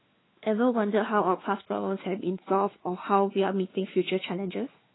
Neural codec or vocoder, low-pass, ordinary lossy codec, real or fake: codec, 16 kHz, 4 kbps, X-Codec, WavLM features, trained on Multilingual LibriSpeech; 7.2 kHz; AAC, 16 kbps; fake